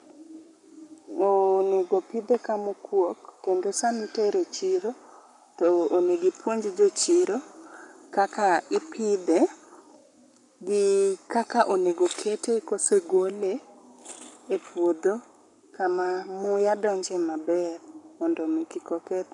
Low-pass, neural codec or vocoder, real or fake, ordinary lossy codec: 10.8 kHz; codec, 44.1 kHz, 7.8 kbps, Pupu-Codec; fake; none